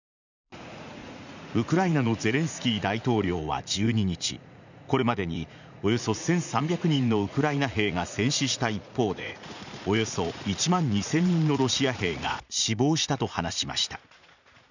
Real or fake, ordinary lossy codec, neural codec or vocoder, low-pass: fake; none; vocoder, 44.1 kHz, 80 mel bands, Vocos; 7.2 kHz